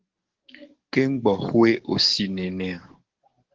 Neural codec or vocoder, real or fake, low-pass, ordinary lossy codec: codec, 44.1 kHz, 7.8 kbps, DAC; fake; 7.2 kHz; Opus, 16 kbps